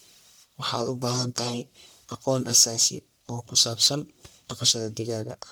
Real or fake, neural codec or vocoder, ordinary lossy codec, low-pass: fake; codec, 44.1 kHz, 1.7 kbps, Pupu-Codec; none; none